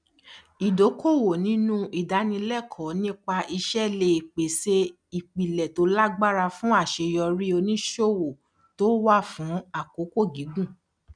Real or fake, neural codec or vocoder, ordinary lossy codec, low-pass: real; none; none; 9.9 kHz